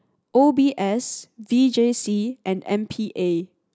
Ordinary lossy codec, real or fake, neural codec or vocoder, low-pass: none; real; none; none